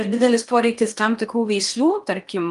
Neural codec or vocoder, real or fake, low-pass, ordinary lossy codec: codec, 16 kHz in and 24 kHz out, 0.8 kbps, FocalCodec, streaming, 65536 codes; fake; 10.8 kHz; Opus, 32 kbps